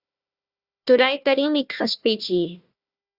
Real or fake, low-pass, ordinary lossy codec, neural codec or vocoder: fake; 5.4 kHz; Opus, 64 kbps; codec, 16 kHz, 1 kbps, FunCodec, trained on Chinese and English, 50 frames a second